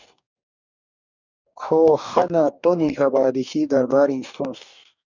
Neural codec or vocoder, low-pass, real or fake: codec, 44.1 kHz, 2.6 kbps, DAC; 7.2 kHz; fake